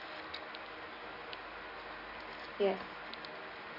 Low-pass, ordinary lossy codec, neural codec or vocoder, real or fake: 5.4 kHz; none; none; real